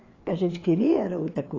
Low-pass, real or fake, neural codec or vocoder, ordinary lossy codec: 7.2 kHz; fake; codec, 16 kHz, 4 kbps, FreqCodec, larger model; AAC, 32 kbps